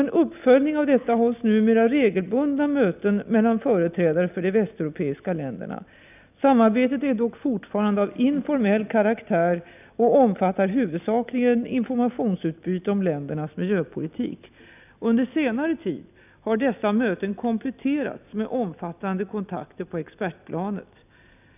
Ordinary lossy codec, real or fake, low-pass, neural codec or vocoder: none; real; 3.6 kHz; none